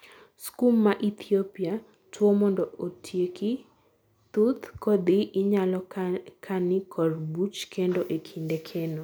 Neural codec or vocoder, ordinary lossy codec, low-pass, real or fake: none; none; none; real